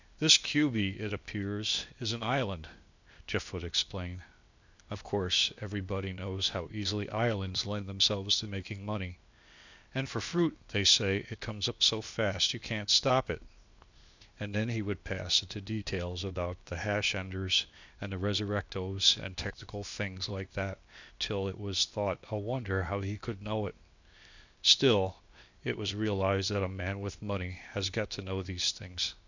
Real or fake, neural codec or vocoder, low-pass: fake; codec, 16 kHz, 0.8 kbps, ZipCodec; 7.2 kHz